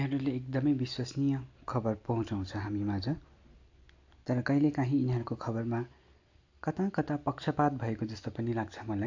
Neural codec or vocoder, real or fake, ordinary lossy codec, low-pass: none; real; AAC, 48 kbps; 7.2 kHz